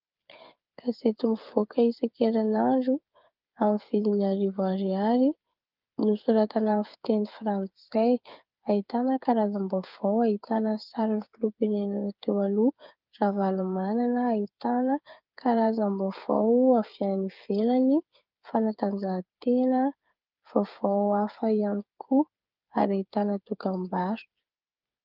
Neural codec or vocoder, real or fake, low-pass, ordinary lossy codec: codec, 16 kHz, 8 kbps, FreqCodec, smaller model; fake; 5.4 kHz; Opus, 32 kbps